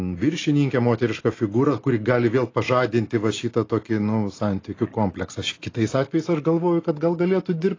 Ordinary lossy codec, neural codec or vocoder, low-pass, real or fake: AAC, 32 kbps; none; 7.2 kHz; real